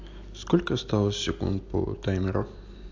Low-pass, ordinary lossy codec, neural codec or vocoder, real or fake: 7.2 kHz; AAC, 48 kbps; none; real